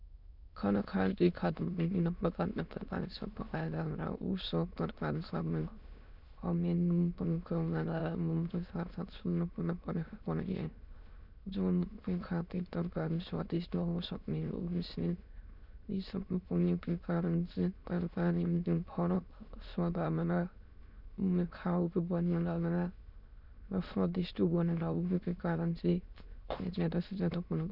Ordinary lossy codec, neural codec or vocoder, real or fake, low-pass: none; autoencoder, 22.05 kHz, a latent of 192 numbers a frame, VITS, trained on many speakers; fake; 5.4 kHz